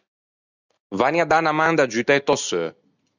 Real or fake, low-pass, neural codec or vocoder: real; 7.2 kHz; none